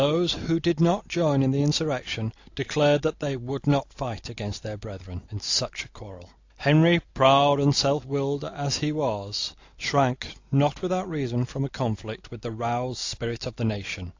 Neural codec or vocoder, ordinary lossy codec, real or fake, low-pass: none; AAC, 48 kbps; real; 7.2 kHz